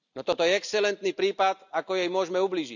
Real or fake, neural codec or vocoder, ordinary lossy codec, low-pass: real; none; none; 7.2 kHz